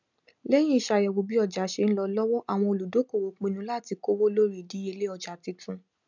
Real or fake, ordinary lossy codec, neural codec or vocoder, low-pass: real; none; none; 7.2 kHz